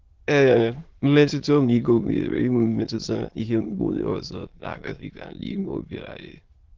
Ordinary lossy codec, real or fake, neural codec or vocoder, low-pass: Opus, 16 kbps; fake; autoencoder, 22.05 kHz, a latent of 192 numbers a frame, VITS, trained on many speakers; 7.2 kHz